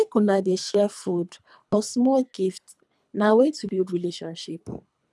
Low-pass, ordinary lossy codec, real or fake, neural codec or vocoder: none; none; fake; codec, 24 kHz, 3 kbps, HILCodec